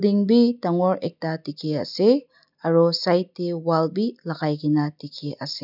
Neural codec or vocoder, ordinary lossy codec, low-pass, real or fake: none; none; 5.4 kHz; real